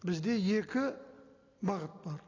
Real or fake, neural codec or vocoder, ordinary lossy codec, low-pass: real; none; AAC, 32 kbps; 7.2 kHz